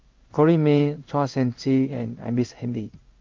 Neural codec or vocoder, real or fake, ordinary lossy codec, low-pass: codec, 16 kHz in and 24 kHz out, 1 kbps, XY-Tokenizer; fake; Opus, 24 kbps; 7.2 kHz